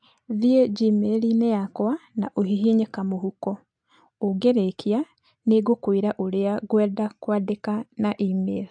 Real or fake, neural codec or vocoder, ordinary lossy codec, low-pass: real; none; AAC, 64 kbps; 9.9 kHz